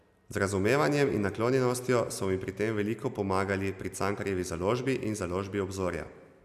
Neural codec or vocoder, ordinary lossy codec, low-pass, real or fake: none; none; 14.4 kHz; real